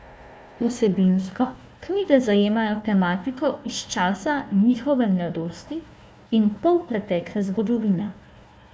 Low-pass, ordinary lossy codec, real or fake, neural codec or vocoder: none; none; fake; codec, 16 kHz, 1 kbps, FunCodec, trained on Chinese and English, 50 frames a second